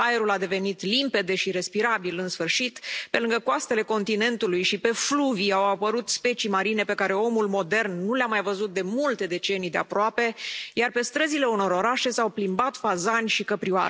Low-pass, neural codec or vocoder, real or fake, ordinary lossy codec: none; none; real; none